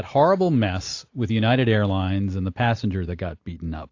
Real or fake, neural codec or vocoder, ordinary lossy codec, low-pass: real; none; AAC, 48 kbps; 7.2 kHz